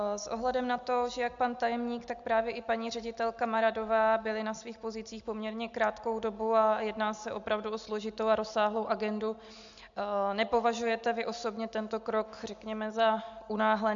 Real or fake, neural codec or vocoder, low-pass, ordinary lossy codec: real; none; 7.2 kHz; MP3, 64 kbps